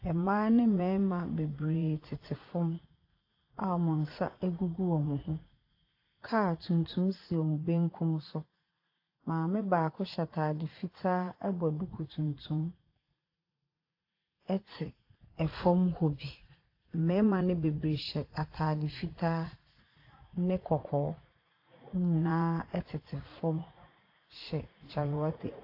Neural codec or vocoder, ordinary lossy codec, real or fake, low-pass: vocoder, 44.1 kHz, 80 mel bands, Vocos; AAC, 32 kbps; fake; 5.4 kHz